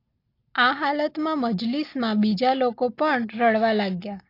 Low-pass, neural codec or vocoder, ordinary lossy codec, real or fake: 5.4 kHz; none; AAC, 32 kbps; real